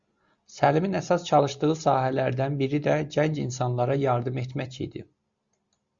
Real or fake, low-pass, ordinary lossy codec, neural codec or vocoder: real; 7.2 kHz; Opus, 64 kbps; none